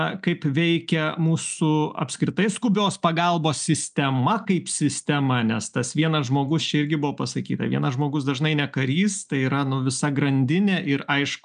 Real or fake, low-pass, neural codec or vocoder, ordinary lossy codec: real; 9.9 kHz; none; MP3, 96 kbps